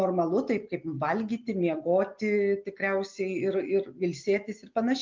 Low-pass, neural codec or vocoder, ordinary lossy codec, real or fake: 7.2 kHz; none; Opus, 24 kbps; real